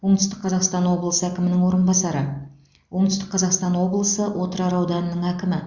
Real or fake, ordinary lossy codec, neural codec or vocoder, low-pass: real; Opus, 64 kbps; none; 7.2 kHz